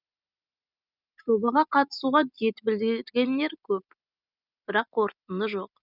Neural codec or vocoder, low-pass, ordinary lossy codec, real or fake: none; 5.4 kHz; none; real